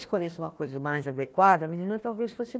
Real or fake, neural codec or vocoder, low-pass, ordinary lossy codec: fake; codec, 16 kHz, 1 kbps, FunCodec, trained on Chinese and English, 50 frames a second; none; none